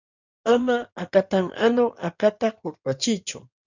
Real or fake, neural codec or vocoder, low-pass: fake; codec, 16 kHz in and 24 kHz out, 2.2 kbps, FireRedTTS-2 codec; 7.2 kHz